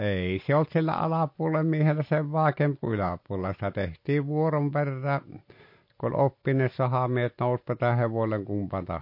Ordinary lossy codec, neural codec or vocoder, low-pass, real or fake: MP3, 32 kbps; none; 5.4 kHz; real